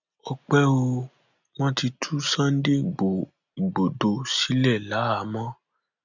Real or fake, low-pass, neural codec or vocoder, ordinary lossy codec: real; 7.2 kHz; none; none